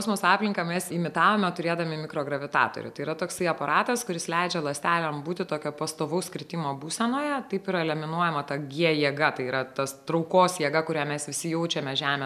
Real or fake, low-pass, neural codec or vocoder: real; 14.4 kHz; none